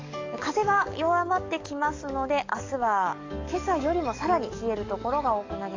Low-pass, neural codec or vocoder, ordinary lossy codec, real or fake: 7.2 kHz; codec, 44.1 kHz, 7.8 kbps, DAC; AAC, 48 kbps; fake